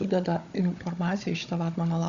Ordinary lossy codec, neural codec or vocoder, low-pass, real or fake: Opus, 64 kbps; codec, 16 kHz, 16 kbps, FunCodec, trained on Chinese and English, 50 frames a second; 7.2 kHz; fake